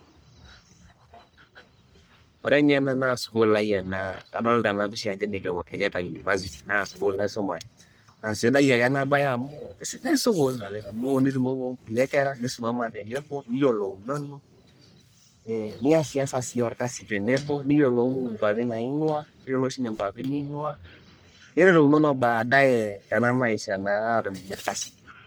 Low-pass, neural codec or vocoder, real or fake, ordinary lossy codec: none; codec, 44.1 kHz, 1.7 kbps, Pupu-Codec; fake; none